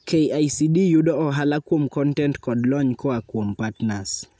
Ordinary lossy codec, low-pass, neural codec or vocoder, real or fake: none; none; none; real